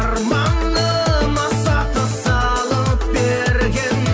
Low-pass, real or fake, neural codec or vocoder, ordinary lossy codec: none; real; none; none